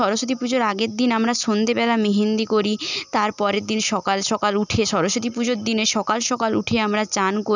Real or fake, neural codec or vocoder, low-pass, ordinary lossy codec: real; none; 7.2 kHz; none